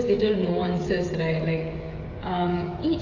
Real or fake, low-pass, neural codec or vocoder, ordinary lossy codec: fake; 7.2 kHz; codec, 16 kHz, 16 kbps, FreqCodec, smaller model; AAC, 32 kbps